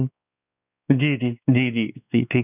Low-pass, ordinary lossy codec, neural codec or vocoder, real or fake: 3.6 kHz; none; codec, 16 kHz, 2 kbps, X-Codec, WavLM features, trained on Multilingual LibriSpeech; fake